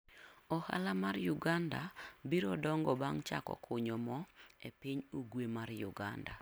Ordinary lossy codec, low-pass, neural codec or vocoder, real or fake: none; none; none; real